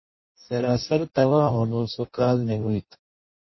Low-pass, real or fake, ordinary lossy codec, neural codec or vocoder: 7.2 kHz; fake; MP3, 24 kbps; codec, 16 kHz in and 24 kHz out, 0.6 kbps, FireRedTTS-2 codec